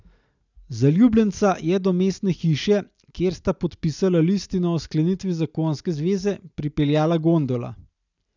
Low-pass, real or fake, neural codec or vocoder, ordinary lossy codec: 7.2 kHz; real; none; none